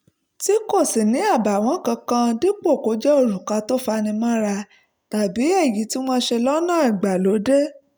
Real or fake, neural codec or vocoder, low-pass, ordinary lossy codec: real; none; none; none